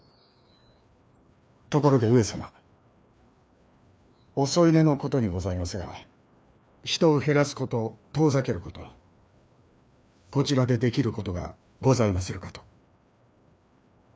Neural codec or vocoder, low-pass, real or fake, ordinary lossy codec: codec, 16 kHz, 2 kbps, FreqCodec, larger model; none; fake; none